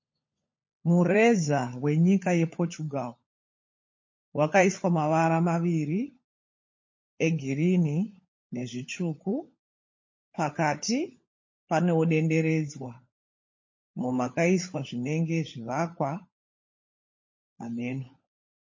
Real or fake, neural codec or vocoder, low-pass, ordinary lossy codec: fake; codec, 16 kHz, 16 kbps, FunCodec, trained on LibriTTS, 50 frames a second; 7.2 kHz; MP3, 32 kbps